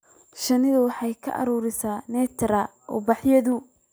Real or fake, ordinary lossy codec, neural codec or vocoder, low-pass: real; none; none; none